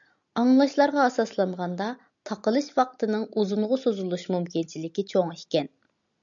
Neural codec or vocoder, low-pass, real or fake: none; 7.2 kHz; real